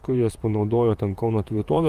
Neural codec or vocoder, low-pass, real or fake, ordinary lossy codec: vocoder, 44.1 kHz, 128 mel bands, Pupu-Vocoder; 14.4 kHz; fake; Opus, 32 kbps